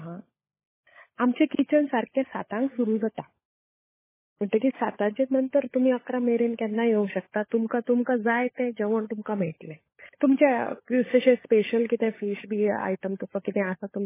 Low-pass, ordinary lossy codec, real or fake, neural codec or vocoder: 3.6 kHz; MP3, 16 kbps; fake; codec, 16 kHz, 16 kbps, FunCodec, trained on LibriTTS, 50 frames a second